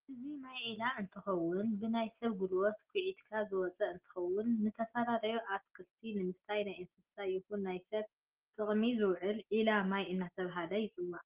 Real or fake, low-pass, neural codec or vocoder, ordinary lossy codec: real; 3.6 kHz; none; Opus, 16 kbps